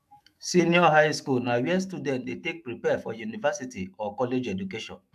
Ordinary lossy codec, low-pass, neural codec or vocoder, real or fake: AAC, 96 kbps; 14.4 kHz; autoencoder, 48 kHz, 128 numbers a frame, DAC-VAE, trained on Japanese speech; fake